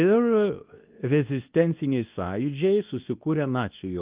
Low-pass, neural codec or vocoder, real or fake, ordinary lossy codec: 3.6 kHz; codec, 24 kHz, 0.9 kbps, WavTokenizer, medium speech release version 2; fake; Opus, 32 kbps